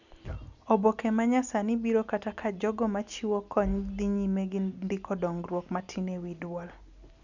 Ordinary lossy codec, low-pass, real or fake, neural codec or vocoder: none; 7.2 kHz; real; none